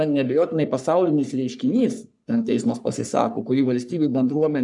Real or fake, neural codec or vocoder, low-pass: fake; codec, 44.1 kHz, 3.4 kbps, Pupu-Codec; 10.8 kHz